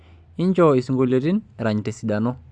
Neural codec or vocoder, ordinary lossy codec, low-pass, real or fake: none; Opus, 64 kbps; 9.9 kHz; real